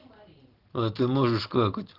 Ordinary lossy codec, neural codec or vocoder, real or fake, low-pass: Opus, 16 kbps; none; real; 5.4 kHz